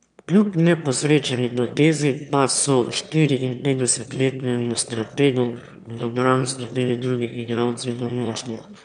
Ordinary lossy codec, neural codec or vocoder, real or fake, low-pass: none; autoencoder, 22.05 kHz, a latent of 192 numbers a frame, VITS, trained on one speaker; fake; 9.9 kHz